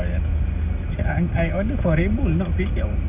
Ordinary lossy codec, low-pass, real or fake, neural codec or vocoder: none; 3.6 kHz; real; none